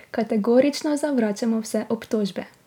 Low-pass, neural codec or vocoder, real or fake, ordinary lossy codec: 19.8 kHz; none; real; none